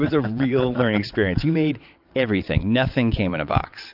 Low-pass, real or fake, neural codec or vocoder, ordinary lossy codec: 5.4 kHz; fake; vocoder, 22.05 kHz, 80 mel bands, WaveNeXt; AAC, 48 kbps